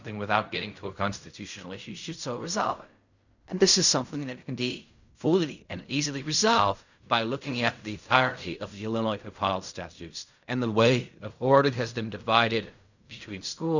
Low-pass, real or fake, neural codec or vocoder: 7.2 kHz; fake; codec, 16 kHz in and 24 kHz out, 0.4 kbps, LongCat-Audio-Codec, fine tuned four codebook decoder